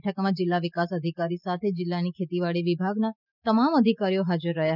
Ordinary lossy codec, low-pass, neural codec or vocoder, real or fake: none; 5.4 kHz; none; real